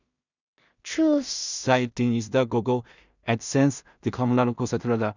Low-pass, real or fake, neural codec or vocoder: 7.2 kHz; fake; codec, 16 kHz in and 24 kHz out, 0.4 kbps, LongCat-Audio-Codec, two codebook decoder